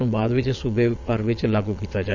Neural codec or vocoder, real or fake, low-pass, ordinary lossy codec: vocoder, 22.05 kHz, 80 mel bands, Vocos; fake; 7.2 kHz; Opus, 64 kbps